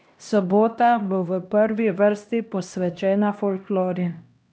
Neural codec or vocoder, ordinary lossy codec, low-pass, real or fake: codec, 16 kHz, 1 kbps, X-Codec, HuBERT features, trained on LibriSpeech; none; none; fake